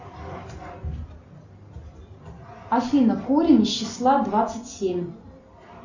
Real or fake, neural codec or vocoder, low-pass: real; none; 7.2 kHz